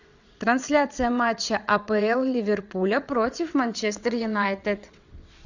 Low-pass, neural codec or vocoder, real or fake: 7.2 kHz; vocoder, 22.05 kHz, 80 mel bands, WaveNeXt; fake